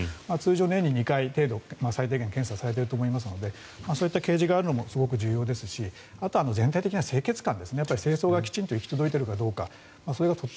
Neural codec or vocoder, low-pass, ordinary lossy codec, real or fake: none; none; none; real